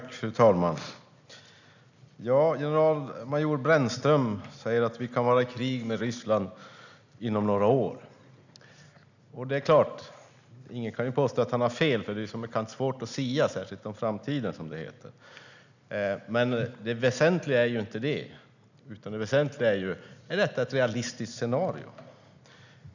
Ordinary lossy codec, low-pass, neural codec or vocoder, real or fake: none; 7.2 kHz; none; real